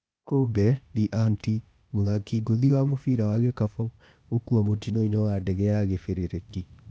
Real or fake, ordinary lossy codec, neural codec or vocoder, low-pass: fake; none; codec, 16 kHz, 0.8 kbps, ZipCodec; none